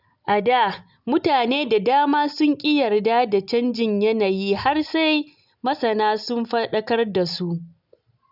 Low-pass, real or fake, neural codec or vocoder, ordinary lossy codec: 5.4 kHz; real; none; none